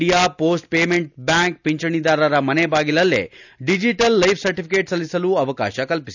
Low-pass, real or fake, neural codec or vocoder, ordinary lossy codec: 7.2 kHz; real; none; none